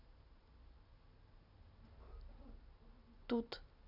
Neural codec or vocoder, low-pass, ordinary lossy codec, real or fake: none; 5.4 kHz; none; real